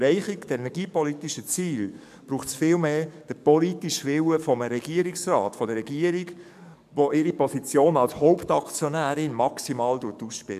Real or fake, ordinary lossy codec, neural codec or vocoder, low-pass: fake; MP3, 96 kbps; codec, 44.1 kHz, 7.8 kbps, DAC; 14.4 kHz